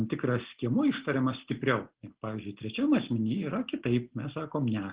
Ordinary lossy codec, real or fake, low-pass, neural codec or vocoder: Opus, 16 kbps; real; 3.6 kHz; none